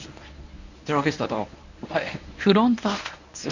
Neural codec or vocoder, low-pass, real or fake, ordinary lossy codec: codec, 24 kHz, 0.9 kbps, WavTokenizer, medium speech release version 1; 7.2 kHz; fake; none